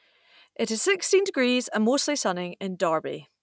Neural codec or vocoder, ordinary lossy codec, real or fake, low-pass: none; none; real; none